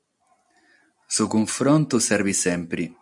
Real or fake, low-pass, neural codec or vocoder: real; 10.8 kHz; none